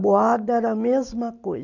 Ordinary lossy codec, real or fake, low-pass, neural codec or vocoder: none; real; 7.2 kHz; none